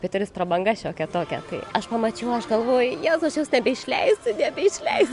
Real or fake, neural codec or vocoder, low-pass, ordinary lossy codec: real; none; 10.8 kHz; MP3, 64 kbps